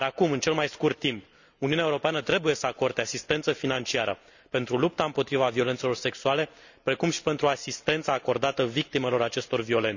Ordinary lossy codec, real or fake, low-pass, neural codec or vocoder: none; real; 7.2 kHz; none